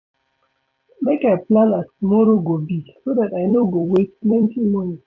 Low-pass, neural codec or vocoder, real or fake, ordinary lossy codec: 7.2 kHz; vocoder, 44.1 kHz, 128 mel bands every 256 samples, BigVGAN v2; fake; AAC, 48 kbps